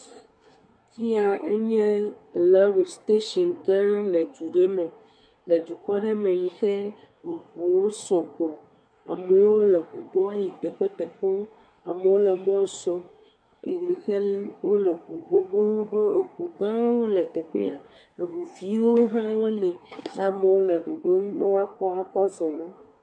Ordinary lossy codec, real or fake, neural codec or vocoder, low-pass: MP3, 64 kbps; fake; codec, 24 kHz, 1 kbps, SNAC; 9.9 kHz